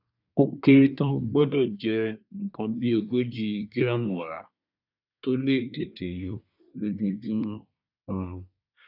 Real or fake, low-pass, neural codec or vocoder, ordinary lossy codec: fake; 5.4 kHz; codec, 24 kHz, 1 kbps, SNAC; none